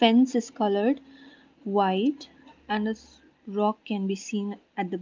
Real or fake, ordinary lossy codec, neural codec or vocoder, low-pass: real; Opus, 24 kbps; none; 7.2 kHz